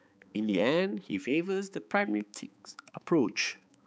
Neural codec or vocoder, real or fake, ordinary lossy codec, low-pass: codec, 16 kHz, 4 kbps, X-Codec, HuBERT features, trained on balanced general audio; fake; none; none